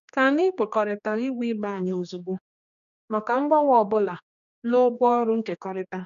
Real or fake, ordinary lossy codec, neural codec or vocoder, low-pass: fake; none; codec, 16 kHz, 2 kbps, X-Codec, HuBERT features, trained on general audio; 7.2 kHz